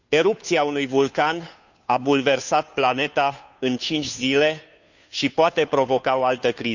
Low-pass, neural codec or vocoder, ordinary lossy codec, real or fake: 7.2 kHz; codec, 16 kHz, 2 kbps, FunCodec, trained on Chinese and English, 25 frames a second; none; fake